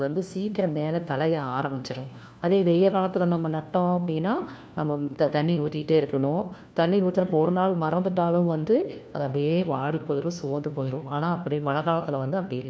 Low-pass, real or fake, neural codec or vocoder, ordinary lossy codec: none; fake; codec, 16 kHz, 1 kbps, FunCodec, trained on LibriTTS, 50 frames a second; none